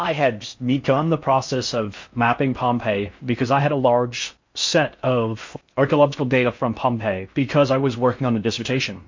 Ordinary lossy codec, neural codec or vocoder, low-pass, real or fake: MP3, 48 kbps; codec, 16 kHz in and 24 kHz out, 0.6 kbps, FocalCodec, streaming, 4096 codes; 7.2 kHz; fake